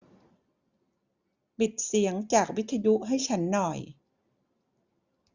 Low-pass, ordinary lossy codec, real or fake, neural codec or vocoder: 7.2 kHz; Opus, 64 kbps; real; none